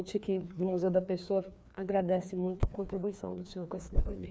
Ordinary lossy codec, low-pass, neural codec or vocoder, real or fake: none; none; codec, 16 kHz, 2 kbps, FreqCodec, larger model; fake